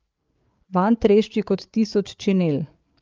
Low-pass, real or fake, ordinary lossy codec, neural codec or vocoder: 7.2 kHz; fake; Opus, 24 kbps; codec, 16 kHz, 8 kbps, FreqCodec, larger model